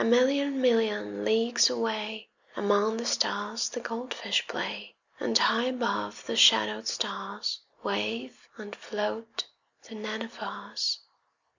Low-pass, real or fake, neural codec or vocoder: 7.2 kHz; real; none